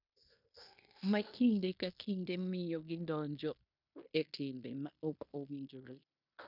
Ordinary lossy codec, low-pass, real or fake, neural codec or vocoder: none; 5.4 kHz; fake; codec, 16 kHz in and 24 kHz out, 0.9 kbps, LongCat-Audio-Codec, fine tuned four codebook decoder